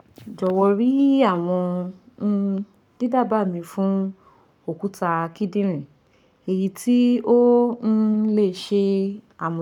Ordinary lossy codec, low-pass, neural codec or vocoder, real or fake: none; 19.8 kHz; codec, 44.1 kHz, 7.8 kbps, Pupu-Codec; fake